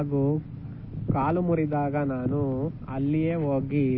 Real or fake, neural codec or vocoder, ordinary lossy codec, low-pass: real; none; MP3, 24 kbps; 7.2 kHz